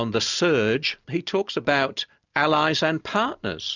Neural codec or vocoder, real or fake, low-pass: none; real; 7.2 kHz